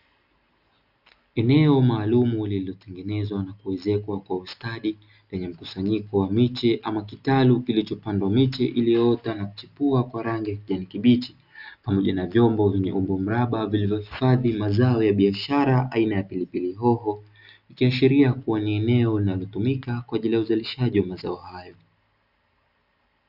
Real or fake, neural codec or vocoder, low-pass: real; none; 5.4 kHz